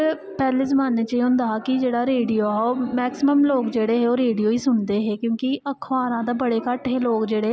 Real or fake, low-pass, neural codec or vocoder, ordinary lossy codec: real; none; none; none